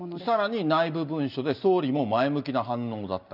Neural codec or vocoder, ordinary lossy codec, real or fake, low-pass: none; none; real; 5.4 kHz